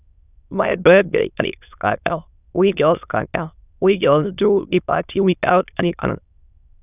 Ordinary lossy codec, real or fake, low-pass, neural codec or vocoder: none; fake; 3.6 kHz; autoencoder, 22.05 kHz, a latent of 192 numbers a frame, VITS, trained on many speakers